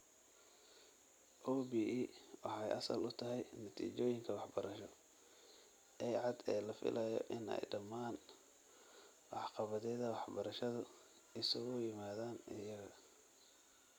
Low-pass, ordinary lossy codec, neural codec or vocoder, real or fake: none; none; none; real